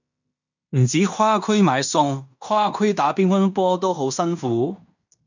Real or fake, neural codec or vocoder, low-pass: fake; codec, 16 kHz in and 24 kHz out, 0.9 kbps, LongCat-Audio-Codec, fine tuned four codebook decoder; 7.2 kHz